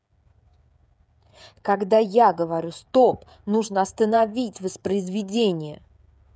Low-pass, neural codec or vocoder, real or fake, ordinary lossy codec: none; codec, 16 kHz, 16 kbps, FreqCodec, smaller model; fake; none